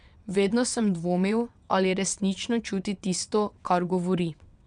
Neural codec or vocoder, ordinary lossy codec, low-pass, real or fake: vocoder, 22.05 kHz, 80 mel bands, WaveNeXt; none; 9.9 kHz; fake